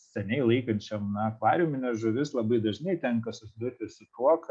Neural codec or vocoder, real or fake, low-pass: none; real; 9.9 kHz